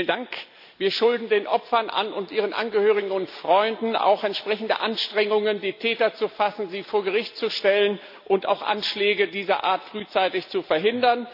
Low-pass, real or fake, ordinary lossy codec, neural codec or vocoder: 5.4 kHz; real; AAC, 48 kbps; none